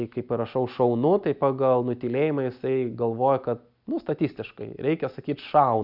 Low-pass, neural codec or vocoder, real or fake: 5.4 kHz; none; real